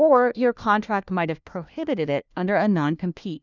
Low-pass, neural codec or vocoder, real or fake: 7.2 kHz; codec, 16 kHz, 1 kbps, FunCodec, trained on LibriTTS, 50 frames a second; fake